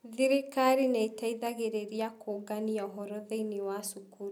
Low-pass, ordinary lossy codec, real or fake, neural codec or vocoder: 19.8 kHz; none; real; none